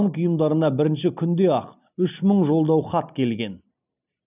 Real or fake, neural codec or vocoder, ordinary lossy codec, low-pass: real; none; none; 3.6 kHz